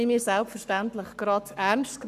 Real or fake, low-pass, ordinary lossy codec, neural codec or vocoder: fake; 14.4 kHz; none; codec, 44.1 kHz, 7.8 kbps, Pupu-Codec